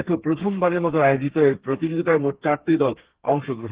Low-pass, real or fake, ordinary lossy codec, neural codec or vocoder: 3.6 kHz; fake; Opus, 16 kbps; codec, 32 kHz, 1.9 kbps, SNAC